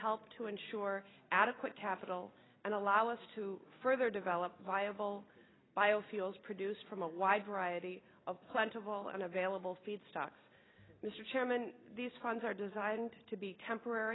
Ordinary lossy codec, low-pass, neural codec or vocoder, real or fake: AAC, 16 kbps; 7.2 kHz; none; real